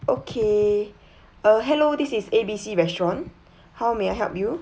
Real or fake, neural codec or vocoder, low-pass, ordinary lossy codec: real; none; none; none